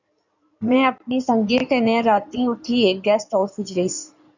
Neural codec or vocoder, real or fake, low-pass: codec, 16 kHz in and 24 kHz out, 2.2 kbps, FireRedTTS-2 codec; fake; 7.2 kHz